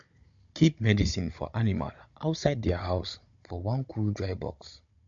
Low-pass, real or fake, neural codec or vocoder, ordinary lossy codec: 7.2 kHz; fake; codec, 16 kHz, 16 kbps, FunCodec, trained on Chinese and English, 50 frames a second; MP3, 48 kbps